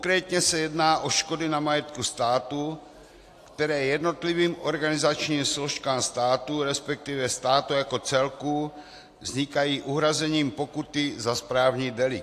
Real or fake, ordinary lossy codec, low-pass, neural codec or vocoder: real; AAC, 64 kbps; 14.4 kHz; none